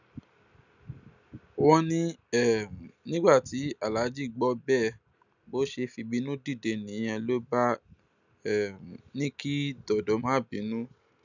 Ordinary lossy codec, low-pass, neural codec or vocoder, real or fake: none; 7.2 kHz; none; real